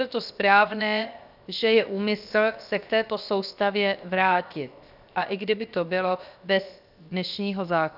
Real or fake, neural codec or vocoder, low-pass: fake; codec, 16 kHz, 0.7 kbps, FocalCodec; 5.4 kHz